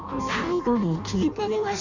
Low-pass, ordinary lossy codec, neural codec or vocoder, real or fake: 7.2 kHz; none; codec, 16 kHz in and 24 kHz out, 0.6 kbps, FireRedTTS-2 codec; fake